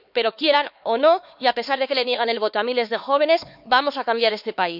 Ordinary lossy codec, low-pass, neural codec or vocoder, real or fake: none; 5.4 kHz; codec, 16 kHz, 4 kbps, X-Codec, HuBERT features, trained on LibriSpeech; fake